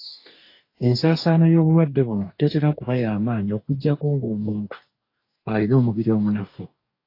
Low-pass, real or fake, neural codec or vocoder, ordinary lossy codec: 5.4 kHz; fake; codec, 44.1 kHz, 2.6 kbps, DAC; AAC, 32 kbps